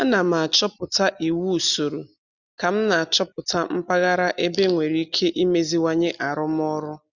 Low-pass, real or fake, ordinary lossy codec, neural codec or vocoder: 7.2 kHz; real; none; none